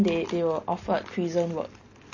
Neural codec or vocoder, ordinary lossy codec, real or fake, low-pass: none; MP3, 32 kbps; real; 7.2 kHz